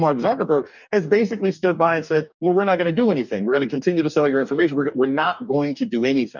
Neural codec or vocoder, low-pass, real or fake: codec, 44.1 kHz, 2.6 kbps, DAC; 7.2 kHz; fake